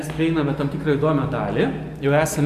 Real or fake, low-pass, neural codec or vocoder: real; 14.4 kHz; none